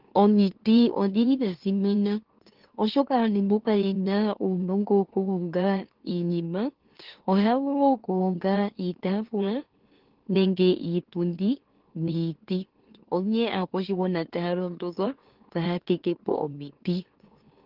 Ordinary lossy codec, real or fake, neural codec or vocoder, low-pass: Opus, 16 kbps; fake; autoencoder, 44.1 kHz, a latent of 192 numbers a frame, MeloTTS; 5.4 kHz